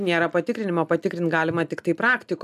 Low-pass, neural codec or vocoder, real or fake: 14.4 kHz; none; real